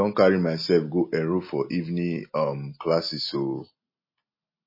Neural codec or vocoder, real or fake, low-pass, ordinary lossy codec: none; real; 5.4 kHz; MP3, 24 kbps